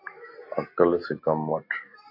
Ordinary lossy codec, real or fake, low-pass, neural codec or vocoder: AAC, 32 kbps; real; 5.4 kHz; none